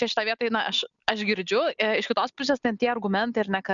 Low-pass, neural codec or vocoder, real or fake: 7.2 kHz; none; real